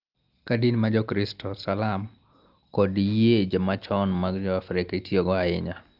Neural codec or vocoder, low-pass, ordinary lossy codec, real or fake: none; 5.4 kHz; Opus, 32 kbps; real